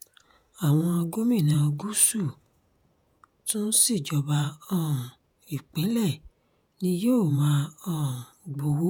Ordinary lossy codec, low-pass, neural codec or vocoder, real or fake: none; none; vocoder, 48 kHz, 128 mel bands, Vocos; fake